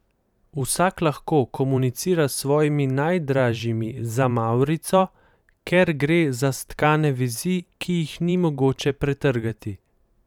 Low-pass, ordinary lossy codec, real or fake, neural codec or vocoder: 19.8 kHz; none; fake; vocoder, 44.1 kHz, 128 mel bands every 512 samples, BigVGAN v2